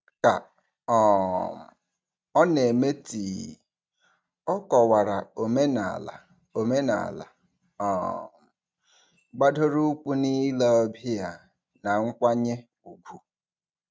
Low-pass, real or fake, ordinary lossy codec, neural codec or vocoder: none; real; none; none